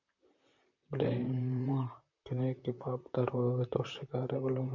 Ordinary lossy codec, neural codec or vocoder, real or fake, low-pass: MP3, 64 kbps; vocoder, 44.1 kHz, 128 mel bands, Pupu-Vocoder; fake; 7.2 kHz